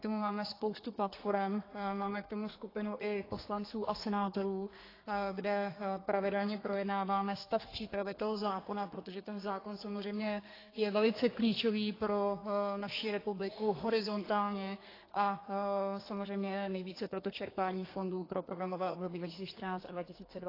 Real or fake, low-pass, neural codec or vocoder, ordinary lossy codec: fake; 5.4 kHz; codec, 32 kHz, 1.9 kbps, SNAC; AAC, 24 kbps